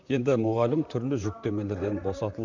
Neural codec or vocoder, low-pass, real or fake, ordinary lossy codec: vocoder, 44.1 kHz, 128 mel bands, Pupu-Vocoder; 7.2 kHz; fake; none